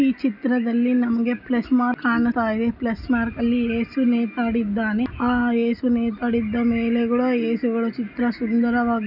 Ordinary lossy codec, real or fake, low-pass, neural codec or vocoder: none; real; 5.4 kHz; none